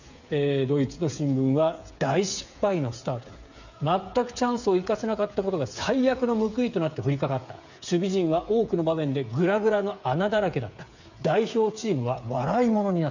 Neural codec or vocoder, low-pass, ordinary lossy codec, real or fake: codec, 16 kHz, 8 kbps, FreqCodec, smaller model; 7.2 kHz; none; fake